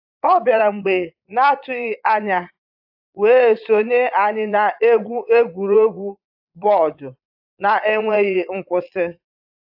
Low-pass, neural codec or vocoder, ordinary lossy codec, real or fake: 5.4 kHz; vocoder, 22.05 kHz, 80 mel bands, Vocos; AAC, 48 kbps; fake